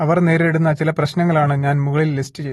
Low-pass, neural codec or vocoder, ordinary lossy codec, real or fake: 19.8 kHz; none; AAC, 32 kbps; real